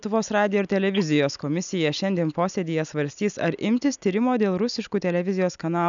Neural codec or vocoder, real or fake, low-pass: none; real; 7.2 kHz